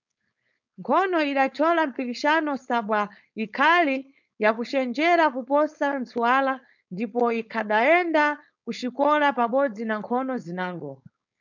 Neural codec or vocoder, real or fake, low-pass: codec, 16 kHz, 4.8 kbps, FACodec; fake; 7.2 kHz